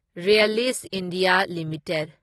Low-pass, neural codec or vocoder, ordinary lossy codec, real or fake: 19.8 kHz; none; AAC, 32 kbps; real